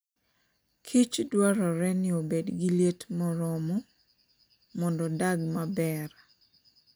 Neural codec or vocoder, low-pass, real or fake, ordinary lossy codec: none; none; real; none